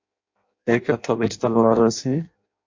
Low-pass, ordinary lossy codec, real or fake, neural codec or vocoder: 7.2 kHz; MP3, 48 kbps; fake; codec, 16 kHz in and 24 kHz out, 0.6 kbps, FireRedTTS-2 codec